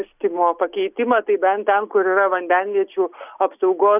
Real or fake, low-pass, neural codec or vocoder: real; 3.6 kHz; none